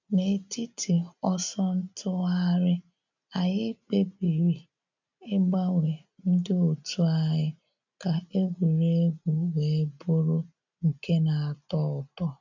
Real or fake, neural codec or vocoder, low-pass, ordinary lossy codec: real; none; 7.2 kHz; none